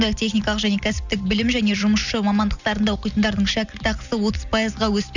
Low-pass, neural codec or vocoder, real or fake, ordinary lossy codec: 7.2 kHz; none; real; none